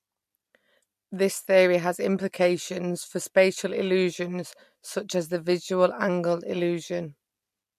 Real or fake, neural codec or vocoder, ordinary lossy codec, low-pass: real; none; MP3, 64 kbps; 14.4 kHz